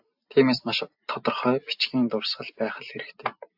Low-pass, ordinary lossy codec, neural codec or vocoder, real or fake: 5.4 kHz; AAC, 48 kbps; none; real